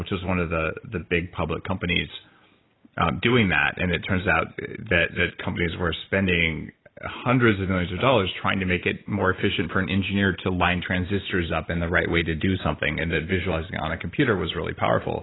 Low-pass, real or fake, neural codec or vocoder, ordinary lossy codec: 7.2 kHz; real; none; AAC, 16 kbps